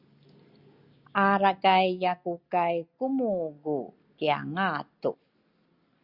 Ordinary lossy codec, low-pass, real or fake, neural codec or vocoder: Opus, 64 kbps; 5.4 kHz; real; none